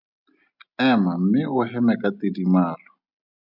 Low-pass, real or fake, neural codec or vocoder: 5.4 kHz; real; none